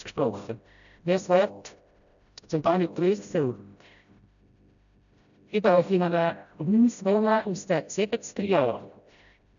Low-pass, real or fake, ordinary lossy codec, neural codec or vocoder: 7.2 kHz; fake; none; codec, 16 kHz, 0.5 kbps, FreqCodec, smaller model